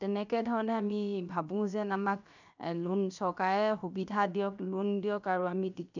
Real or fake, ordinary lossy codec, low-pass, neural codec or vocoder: fake; none; 7.2 kHz; codec, 16 kHz, 0.7 kbps, FocalCodec